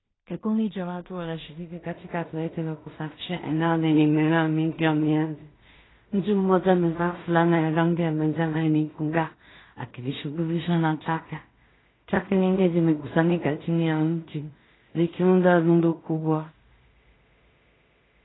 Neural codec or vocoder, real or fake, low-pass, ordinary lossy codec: codec, 16 kHz in and 24 kHz out, 0.4 kbps, LongCat-Audio-Codec, two codebook decoder; fake; 7.2 kHz; AAC, 16 kbps